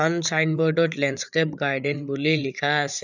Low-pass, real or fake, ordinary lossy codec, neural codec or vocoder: 7.2 kHz; fake; none; codec, 16 kHz, 8 kbps, FreqCodec, larger model